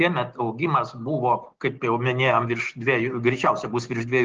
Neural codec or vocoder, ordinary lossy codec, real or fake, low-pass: none; Opus, 16 kbps; real; 7.2 kHz